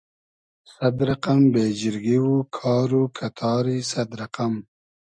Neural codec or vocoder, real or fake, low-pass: none; real; 9.9 kHz